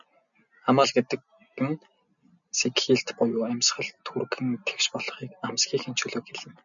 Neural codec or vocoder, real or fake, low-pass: none; real; 7.2 kHz